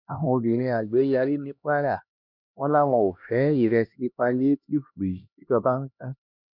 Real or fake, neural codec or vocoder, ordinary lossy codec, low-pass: fake; codec, 16 kHz, 1 kbps, X-Codec, HuBERT features, trained on LibriSpeech; none; 5.4 kHz